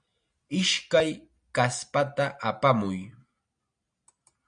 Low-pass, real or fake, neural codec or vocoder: 9.9 kHz; real; none